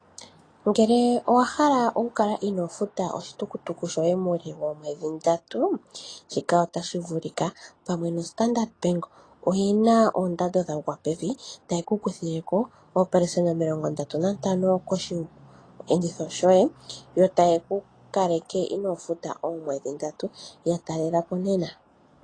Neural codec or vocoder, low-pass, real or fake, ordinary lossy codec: none; 9.9 kHz; real; AAC, 32 kbps